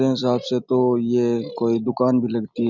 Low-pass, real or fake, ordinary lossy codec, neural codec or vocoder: 7.2 kHz; real; Opus, 64 kbps; none